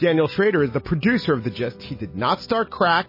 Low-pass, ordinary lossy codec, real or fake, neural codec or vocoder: 5.4 kHz; MP3, 24 kbps; real; none